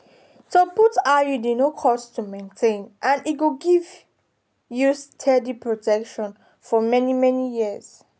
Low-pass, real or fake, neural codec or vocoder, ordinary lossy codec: none; real; none; none